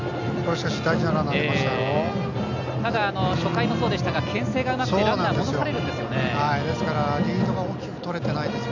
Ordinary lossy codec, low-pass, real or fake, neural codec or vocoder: none; 7.2 kHz; real; none